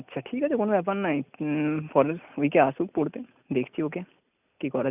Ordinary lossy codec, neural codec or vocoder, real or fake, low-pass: none; none; real; 3.6 kHz